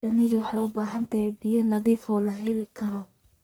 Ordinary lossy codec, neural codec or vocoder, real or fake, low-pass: none; codec, 44.1 kHz, 1.7 kbps, Pupu-Codec; fake; none